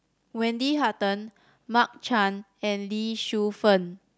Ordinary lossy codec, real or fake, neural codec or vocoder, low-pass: none; real; none; none